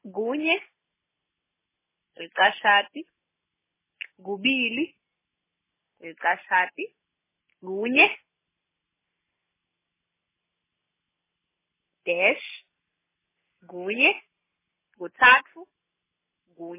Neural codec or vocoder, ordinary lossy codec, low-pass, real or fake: none; MP3, 16 kbps; 3.6 kHz; real